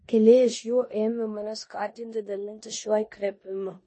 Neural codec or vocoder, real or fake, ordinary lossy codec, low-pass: codec, 16 kHz in and 24 kHz out, 0.9 kbps, LongCat-Audio-Codec, four codebook decoder; fake; MP3, 32 kbps; 10.8 kHz